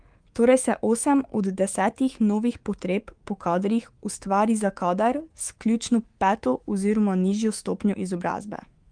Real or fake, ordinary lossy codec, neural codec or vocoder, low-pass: fake; Opus, 24 kbps; codec, 24 kHz, 3.1 kbps, DualCodec; 9.9 kHz